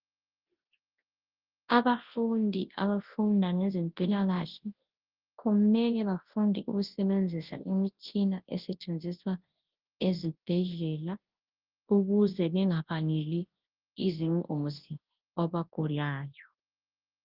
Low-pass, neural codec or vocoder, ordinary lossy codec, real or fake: 5.4 kHz; codec, 24 kHz, 0.9 kbps, WavTokenizer, large speech release; Opus, 16 kbps; fake